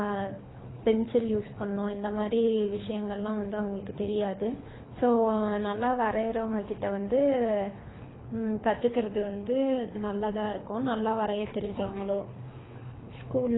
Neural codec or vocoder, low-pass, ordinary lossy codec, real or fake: codec, 24 kHz, 3 kbps, HILCodec; 7.2 kHz; AAC, 16 kbps; fake